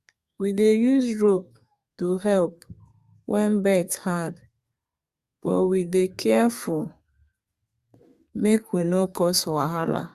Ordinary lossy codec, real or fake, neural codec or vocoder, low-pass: Opus, 64 kbps; fake; codec, 32 kHz, 1.9 kbps, SNAC; 14.4 kHz